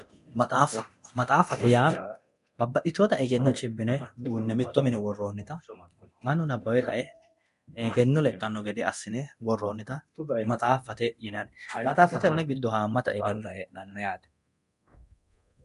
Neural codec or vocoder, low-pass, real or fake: codec, 24 kHz, 0.9 kbps, DualCodec; 10.8 kHz; fake